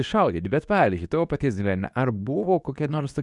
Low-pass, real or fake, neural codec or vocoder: 10.8 kHz; fake; codec, 24 kHz, 0.9 kbps, WavTokenizer, medium speech release version 2